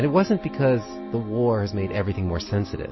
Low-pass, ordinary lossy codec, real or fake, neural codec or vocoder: 7.2 kHz; MP3, 24 kbps; real; none